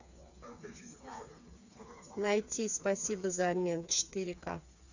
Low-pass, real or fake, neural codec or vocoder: 7.2 kHz; fake; codec, 16 kHz, 4 kbps, FreqCodec, smaller model